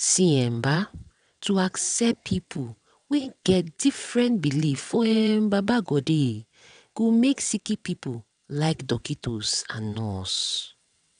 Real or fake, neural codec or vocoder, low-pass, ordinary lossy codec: fake; vocoder, 22.05 kHz, 80 mel bands, WaveNeXt; 9.9 kHz; none